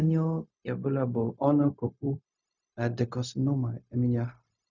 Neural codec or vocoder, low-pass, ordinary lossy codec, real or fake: codec, 16 kHz, 0.4 kbps, LongCat-Audio-Codec; 7.2 kHz; none; fake